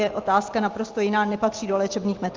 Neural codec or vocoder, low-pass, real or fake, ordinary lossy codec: none; 7.2 kHz; real; Opus, 32 kbps